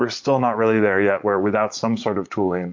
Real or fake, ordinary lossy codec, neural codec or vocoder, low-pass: fake; MP3, 48 kbps; vocoder, 44.1 kHz, 80 mel bands, Vocos; 7.2 kHz